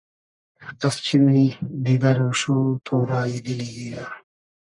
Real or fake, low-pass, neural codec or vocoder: fake; 10.8 kHz; codec, 44.1 kHz, 1.7 kbps, Pupu-Codec